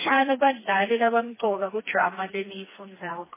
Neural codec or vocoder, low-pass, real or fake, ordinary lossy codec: codec, 16 kHz, 2 kbps, FreqCodec, smaller model; 3.6 kHz; fake; MP3, 16 kbps